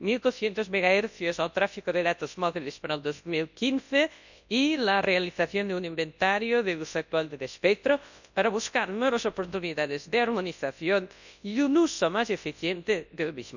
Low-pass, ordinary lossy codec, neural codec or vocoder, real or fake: 7.2 kHz; none; codec, 24 kHz, 0.9 kbps, WavTokenizer, large speech release; fake